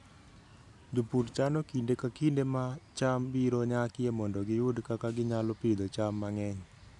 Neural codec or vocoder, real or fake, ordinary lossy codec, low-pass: none; real; none; 10.8 kHz